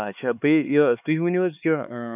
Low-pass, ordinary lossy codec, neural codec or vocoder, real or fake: 3.6 kHz; none; codec, 16 kHz, 4 kbps, X-Codec, HuBERT features, trained on LibriSpeech; fake